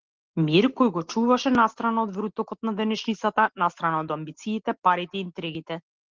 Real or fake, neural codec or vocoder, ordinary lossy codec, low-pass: real; none; Opus, 16 kbps; 7.2 kHz